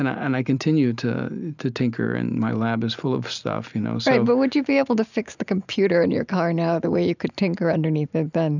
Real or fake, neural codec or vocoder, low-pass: real; none; 7.2 kHz